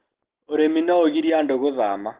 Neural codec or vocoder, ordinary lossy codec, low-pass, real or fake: none; Opus, 16 kbps; 3.6 kHz; real